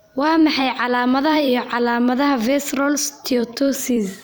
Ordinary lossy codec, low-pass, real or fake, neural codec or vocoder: none; none; fake; vocoder, 44.1 kHz, 128 mel bands every 256 samples, BigVGAN v2